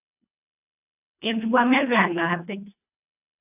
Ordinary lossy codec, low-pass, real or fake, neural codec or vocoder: AAC, 24 kbps; 3.6 kHz; fake; codec, 24 kHz, 1.5 kbps, HILCodec